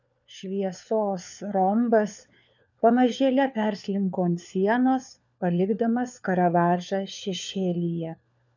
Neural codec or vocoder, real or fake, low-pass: codec, 16 kHz, 4 kbps, FunCodec, trained on LibriTTS, 50 frames a second; fake; 7.2 kHz